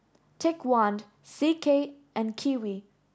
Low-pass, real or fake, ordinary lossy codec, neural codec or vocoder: none; real; none; none